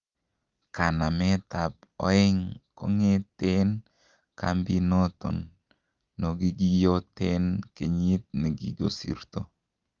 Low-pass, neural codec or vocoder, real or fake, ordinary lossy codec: 7.2 kHz; none; real; Opus, 32 kbps